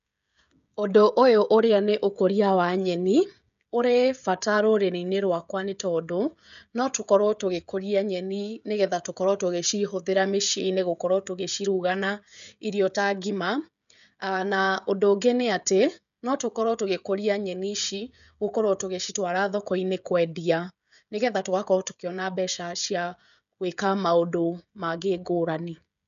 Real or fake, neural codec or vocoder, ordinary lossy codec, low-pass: fake; codec, 16 kHz, 16 kbps, FreqCodec, smaller model; none; 7.2 kHz